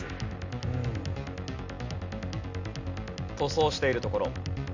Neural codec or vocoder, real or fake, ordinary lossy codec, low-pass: none; real; none; 7.2 kHz